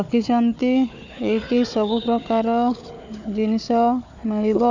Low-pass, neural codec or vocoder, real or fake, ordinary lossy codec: 7.2 kHz; codec, 16 kHz, 4 kbps, FunCodec, trained on Chinese and English, 50 frames a second; fake; none